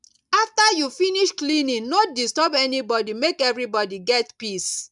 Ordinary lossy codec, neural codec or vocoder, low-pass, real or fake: none; none; 10.8 kHz; real